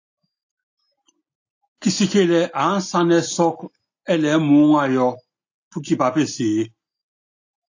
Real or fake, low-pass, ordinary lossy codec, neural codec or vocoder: real; 7.2 kHz; AAC, 48 kbps; none